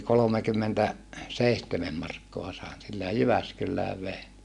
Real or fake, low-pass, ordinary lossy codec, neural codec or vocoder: real; 10.8 kHz; none; none